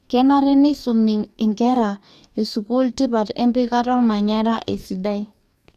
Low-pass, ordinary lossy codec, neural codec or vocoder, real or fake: 14.4 kHz; none; codec, 44.1 kHz, 2.6 kbps, DAC; fake